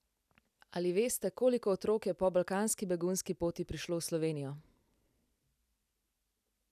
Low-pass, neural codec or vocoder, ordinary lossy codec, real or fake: 14.4 kHz; none; none; real